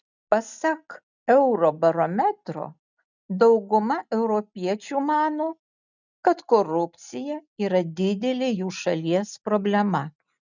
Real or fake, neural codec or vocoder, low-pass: real; none; 7.2 kHz